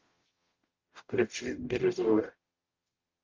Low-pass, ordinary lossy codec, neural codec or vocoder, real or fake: 7.2 kHz; Opus, 32 kbps; codec, 44.1 kHz, 0.9 kbps, DAC; fake